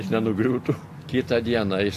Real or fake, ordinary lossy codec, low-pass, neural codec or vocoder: real; AAC, 64 kbps; 14.4 kHz; none